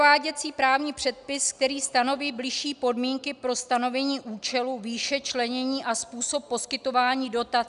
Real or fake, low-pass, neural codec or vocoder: real; 10.8 kHz; none